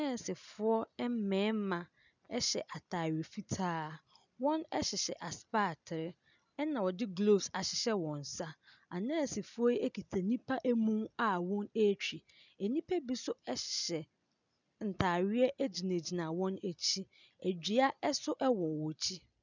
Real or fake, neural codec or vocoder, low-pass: real; none; 7.2 kHz